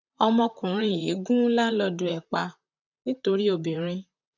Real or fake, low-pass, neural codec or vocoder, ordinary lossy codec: fake; 7.2 kHz; vocoder, 44.1 kHz, 128 mel bands, Pupu-Vocoder; none